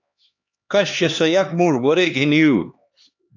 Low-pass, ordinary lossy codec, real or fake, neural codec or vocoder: 7.2 kHz; AAC, 48 kbps; fake; codec, 16 kHz, 2 kbps, X-Codec, HuBERT features, trained on LibriSpeech